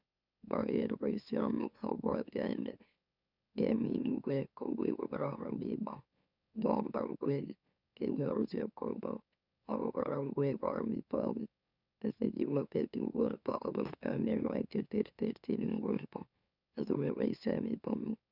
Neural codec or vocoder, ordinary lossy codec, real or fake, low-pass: autoencoder, 44.1 kHz, a latent of 192 numbers a frame, MeloTTS; none; fake; 5.4 kHz